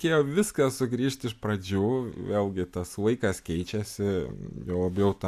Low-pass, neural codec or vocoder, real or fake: 14.4 kHz; none; real